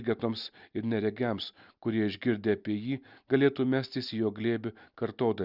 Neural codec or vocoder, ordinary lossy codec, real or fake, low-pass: none; Opus, 64 kbps; real; 5.4 kHz